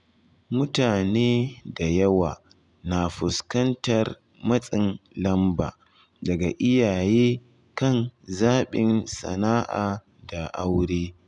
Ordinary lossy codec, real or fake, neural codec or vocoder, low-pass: none; real; none; 10.8 kHz